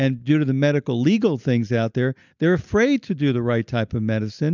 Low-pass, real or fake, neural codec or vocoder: 7.2 kHz; real; none